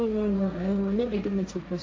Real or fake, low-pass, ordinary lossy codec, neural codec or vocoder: fake; none; none; codec, 16 kHz, 1.1 kbps, Voila-Tokenizer